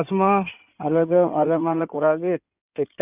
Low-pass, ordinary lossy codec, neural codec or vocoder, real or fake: 3.6 kHz; none; codec, 16 kHz in and 24 kHz out, 2.2 kbps, FireRedTTS-2 codec; fake